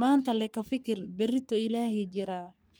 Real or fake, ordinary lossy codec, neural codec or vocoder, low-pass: fake; none; codec, 44.1 kHz, 3.4 kbps, Pupu-Codec; none